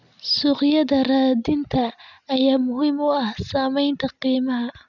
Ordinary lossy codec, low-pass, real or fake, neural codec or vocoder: none; 7.2 kHz; real; none